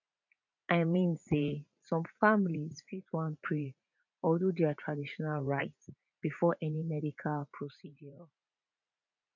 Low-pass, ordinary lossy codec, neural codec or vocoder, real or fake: 7.2 kHz; none; vocoder, 44.1 kHz, 80 mel bands, Vocos; fake